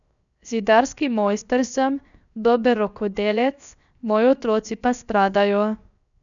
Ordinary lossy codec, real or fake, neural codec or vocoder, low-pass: none; fake; codec, 16 kHz, 0.7 kbps, FocalCodec; 7.2 kHz